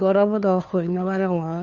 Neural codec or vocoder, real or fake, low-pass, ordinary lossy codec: codec, 16 kHz, 2 kbps, FunCodec, trained on Chinese and English, 25 frames a second; fake; 7.2 kHz; none